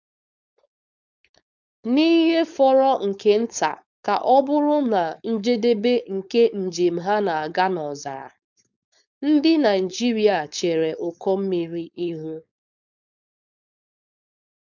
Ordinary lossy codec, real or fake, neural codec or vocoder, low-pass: none; fake; codec, 16 kHz, 4.8 kbps, FACodec; 7.2 kHz